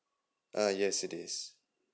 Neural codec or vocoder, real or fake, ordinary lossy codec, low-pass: none; real; none; none